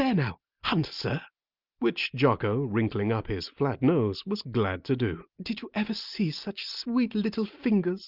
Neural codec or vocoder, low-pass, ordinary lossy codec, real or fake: none; 5.4 kHz; Opus, 24 kbps; real